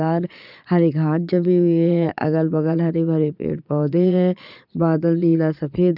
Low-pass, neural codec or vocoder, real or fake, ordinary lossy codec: 5.4 kHz; vocoder, 22.05 kHz, 80 mel bands, Vocos; fake; none